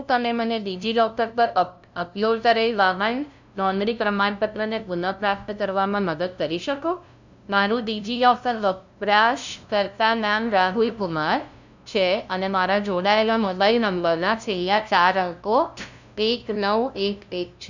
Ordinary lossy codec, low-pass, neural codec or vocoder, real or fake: none; 7.2 kHz; codec, 16 kHz, 0.5 kbps, FunCodec, trained on LibriTTS, 25 frames a second; fake